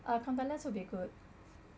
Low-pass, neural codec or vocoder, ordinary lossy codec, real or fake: none; none; none; real